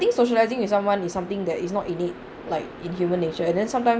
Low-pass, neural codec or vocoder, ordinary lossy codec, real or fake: none; none; none; real